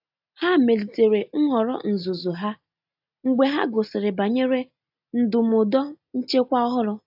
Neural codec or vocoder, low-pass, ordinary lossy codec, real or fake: none; 5.4 kHz; AAC, 48 kbps; real